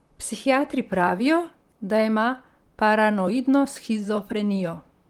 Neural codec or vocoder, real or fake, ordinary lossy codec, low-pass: vocoder, 44.1 kHz, 128 mel bands, Pupu-Vocoder; fake; Opus, 24 kbps; 19.8 kHz